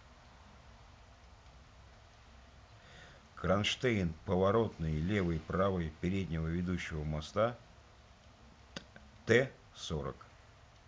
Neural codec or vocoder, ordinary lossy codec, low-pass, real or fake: none; none; none; real